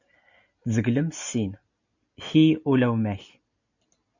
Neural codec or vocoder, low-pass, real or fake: none; 7.2 kHz; real